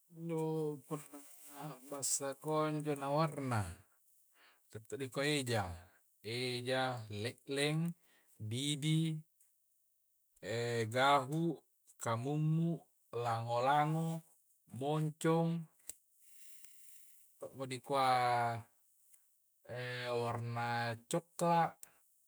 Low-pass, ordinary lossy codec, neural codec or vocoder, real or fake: none; none; autoencoder, 48 kHz, 128 numbers a frame, DAC-VAE, trained on Japanese speech; fake